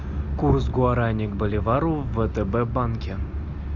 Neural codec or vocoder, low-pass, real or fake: none; 7.2 kHz; real